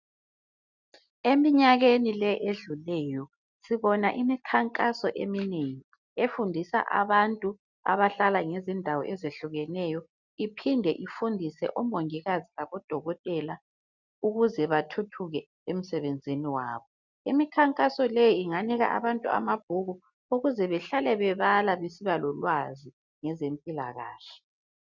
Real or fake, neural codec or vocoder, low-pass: real; none; 7.2 kHz